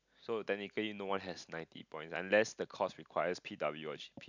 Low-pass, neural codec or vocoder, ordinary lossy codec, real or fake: 7.2 kHz; none; none; real